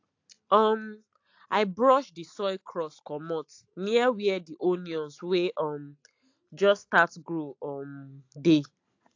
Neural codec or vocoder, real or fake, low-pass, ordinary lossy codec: none; real; 7.2 kHz; AAC, 48 kbps